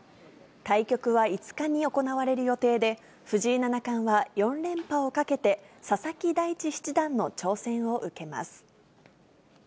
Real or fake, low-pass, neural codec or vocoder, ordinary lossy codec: real; none; none; none